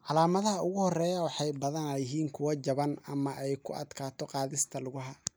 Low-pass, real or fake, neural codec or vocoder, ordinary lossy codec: none; real; none; none